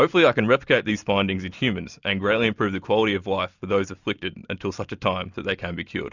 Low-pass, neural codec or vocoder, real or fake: 7.2 kHz; none; real